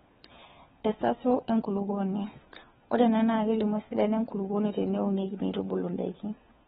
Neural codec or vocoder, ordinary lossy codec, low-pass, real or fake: codec, 16 kHz, 4 kbps, FunCodec, trained on LibriTTS, 50 frames a second; AAC, 16 kbps; 7.2 kHz; fake